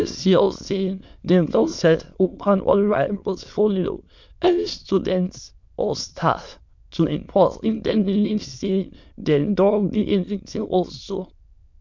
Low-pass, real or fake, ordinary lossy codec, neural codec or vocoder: 7.2 kHz; fake; MP3, 64 kbps; autoencoder, 22.05 kHz, a latent of 192 numbers a frame, VITS, trained on many speakers